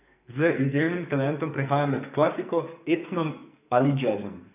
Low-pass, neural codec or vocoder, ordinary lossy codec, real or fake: 3.6 kHz; codec, 44.1 kHz, 2.6 kbps, SNAC; none; fake